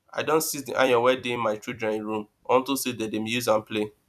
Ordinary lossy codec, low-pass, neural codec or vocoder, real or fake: none; 14.4 kHz; vocoder, 44.1 kHz, 128 mel bands every 256 samples, BigVGAN v2; fake